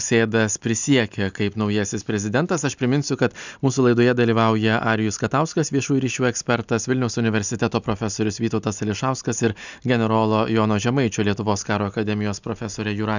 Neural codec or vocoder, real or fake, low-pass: none; real; 7.2 kHz